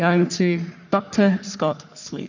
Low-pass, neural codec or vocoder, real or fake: 7.2 kHz; codec, 44.1 kHz, 3.4 kbps, Pupu-Codec; fake